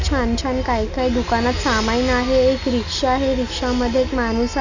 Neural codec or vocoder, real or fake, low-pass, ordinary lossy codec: none; real; 7.2 kHz; none